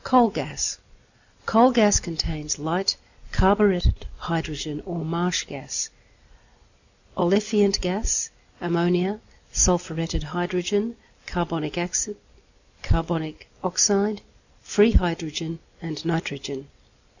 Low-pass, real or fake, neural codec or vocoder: 7.2 kHz; real; none